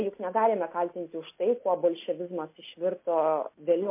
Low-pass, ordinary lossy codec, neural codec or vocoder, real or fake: 3.6 kHz; MP3, 24 kbps; none; real